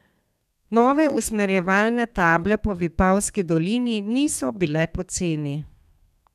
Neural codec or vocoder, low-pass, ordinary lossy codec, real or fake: codec, 32 kHz, 1.9 kbps, SNAC; 14.4 kHz; none; fake